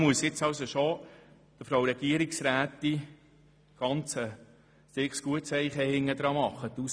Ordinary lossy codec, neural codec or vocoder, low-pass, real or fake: none; none; none; real